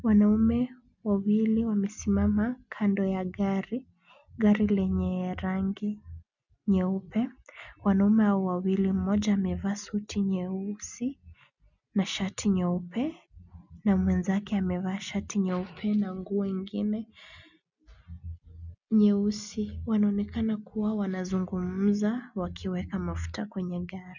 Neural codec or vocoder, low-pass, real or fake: none; 7.2 kHz; real